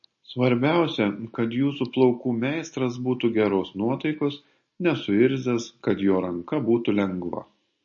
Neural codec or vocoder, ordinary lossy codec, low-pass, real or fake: none; MP3, 32 kbps; 7.2 kHz; real